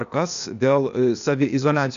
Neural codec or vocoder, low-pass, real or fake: codec, 16 kHz, 0.8 kbps, ZipCodec; 7.2 kHz; fake